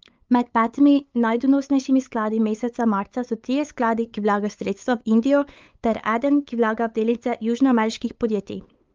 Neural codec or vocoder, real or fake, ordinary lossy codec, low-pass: codec, 16 kHz, 8 kbps, FunCodec, trained on LibriTTS, 25 frames a second; fake; Opus, 32 kbps; 7.2 kHz